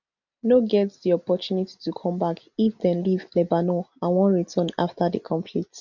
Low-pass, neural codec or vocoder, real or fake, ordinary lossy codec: 7.2 kHz; none; real; none